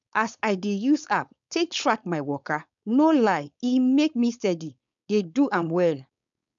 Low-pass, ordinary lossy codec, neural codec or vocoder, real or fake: 7.2 kHz; none; codec, 16 kHz, 4.8 kbps, FACodec; fake